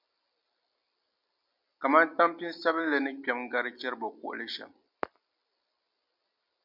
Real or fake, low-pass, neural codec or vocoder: real; 5.4 kHz; none